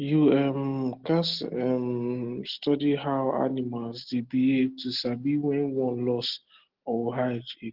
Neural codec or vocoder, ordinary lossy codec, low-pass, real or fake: none; Opus, 16 kbps; 5.4 kHz; real